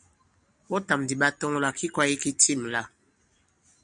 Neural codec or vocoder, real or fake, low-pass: none; real; 9.9 kHz